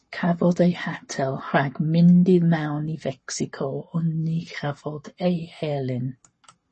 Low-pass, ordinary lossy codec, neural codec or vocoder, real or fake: 10.8 kHz; MP3, 32 kbps; codec, 44.1 kHz, 7.8 kbps, Pupu-Codec; fake